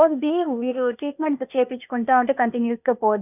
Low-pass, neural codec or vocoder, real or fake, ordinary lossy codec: 3.6 kHz; codec, 16 kHz, 0.8 kbps, ZipCodec; fake; none